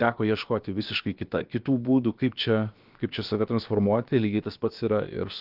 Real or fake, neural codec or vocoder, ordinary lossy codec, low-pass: fake; codec, 16 kHz, about 1 kbps, DyCAST, with the encoder's durations; Opus, 32 kbps; 5.4 kHz